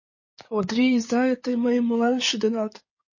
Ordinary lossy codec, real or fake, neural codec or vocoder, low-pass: MP3, 32 kbps; fake; codec, 16 kHz in and 24 kHz out, 2.2 kbps, FireRedTTS-2 codec; 7.2 kHz